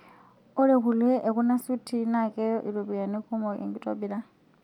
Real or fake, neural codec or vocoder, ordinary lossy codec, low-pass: real; none; none; 19.8 kHz